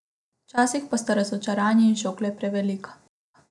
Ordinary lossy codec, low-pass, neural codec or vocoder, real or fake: none; 10.8 kHz; none; real